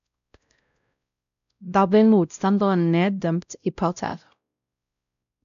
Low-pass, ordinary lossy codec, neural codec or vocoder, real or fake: 7.2 kHz; none; codec, 16 kHz, 0.5 kbps, X-Codec, WavLM features, trained on Multilingual LibriSpeech; fake